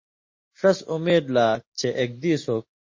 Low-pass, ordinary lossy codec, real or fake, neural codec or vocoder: 7.2 kHz; MP3, 32 kbps; real; none